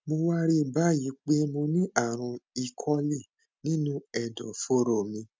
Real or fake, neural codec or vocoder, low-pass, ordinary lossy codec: real; none; none; none